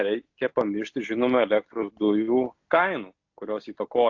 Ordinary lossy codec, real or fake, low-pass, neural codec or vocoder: AAC, 48 kbps; real; 7.2 kHz; none